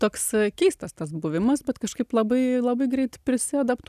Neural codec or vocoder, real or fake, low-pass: none; real; 14.4 kHz